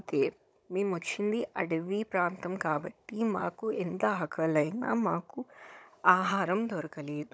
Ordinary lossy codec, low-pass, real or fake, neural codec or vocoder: none; none; fake; codec, 16 kHz, 16 kbps, FunCodec, trained on Chinese and English, 50 frames a second